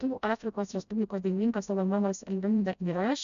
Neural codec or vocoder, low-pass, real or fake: codec, 16 kHz, 0.5 kbps, FreqCodec, smaller model; 7.2 kHz; fake